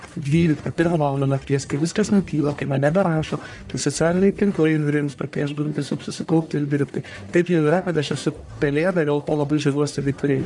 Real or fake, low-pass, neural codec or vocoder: fake; 10.8 kHz; codec, 44.1 kHz, 1.7 kbps, Pupu-Codec